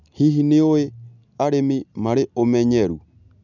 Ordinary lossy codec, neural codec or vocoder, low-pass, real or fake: none; none; 7.2 kHz; real